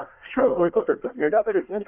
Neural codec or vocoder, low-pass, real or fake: codec, 24 kHz, 1 kbps, SNAC; 3.6 kHz; fake